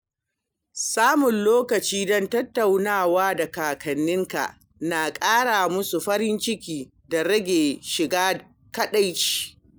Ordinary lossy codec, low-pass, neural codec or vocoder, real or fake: none; none; none; real